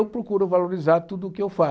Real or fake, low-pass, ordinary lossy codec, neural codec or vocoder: real; none; none; none